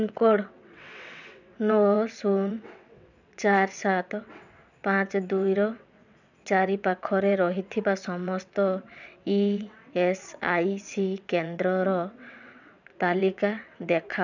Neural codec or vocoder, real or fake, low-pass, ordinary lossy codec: vocoder, 22.05 kHz, 80 mel bands, WaveNeXt; fake; 7.2 kHz; none